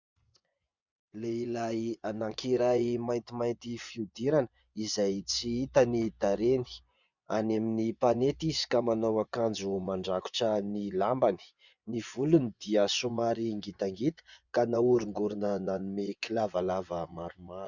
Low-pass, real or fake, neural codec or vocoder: 7.2 kHz; fake; vocoder, 24 kHz, 100 mel bands, Vocos